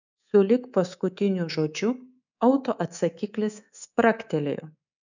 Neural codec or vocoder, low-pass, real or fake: codec, 24 kHz, 3.1 kbps, DualCodec; 7.2 kHz; fake